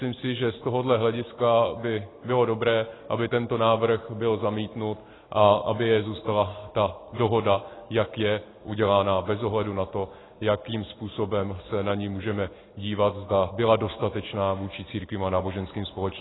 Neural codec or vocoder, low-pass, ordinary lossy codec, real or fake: none; 7.2 kHz; AAC, 16 kbps; real